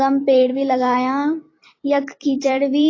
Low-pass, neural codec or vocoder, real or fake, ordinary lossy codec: 7.2 kHz; vocoder, 44.1 kHz, 128 mel bands every 256 samples, BigVGAN v2; fake; AAC, 32 kbps